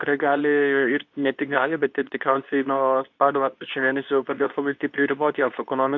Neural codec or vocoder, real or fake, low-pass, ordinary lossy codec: codec, 24 kHz, 0.9 kbps, WavTokenizer, medium speech release version 2; fake; 7.2 kHz; MP3, 48 kbps